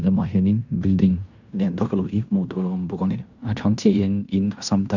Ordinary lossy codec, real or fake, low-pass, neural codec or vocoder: none; fake; 7.2 kHz; codec, 16 kHz in and 24 kHz out, 0.9 kbps, LongCat-Audio-Codec, fine tuned four codebook decoder